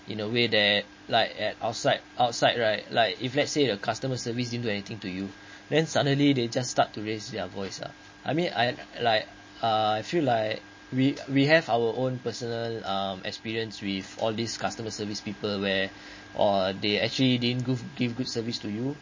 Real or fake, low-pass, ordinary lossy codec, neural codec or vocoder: real; 7.2 kHz; MP3, 32 kbps; none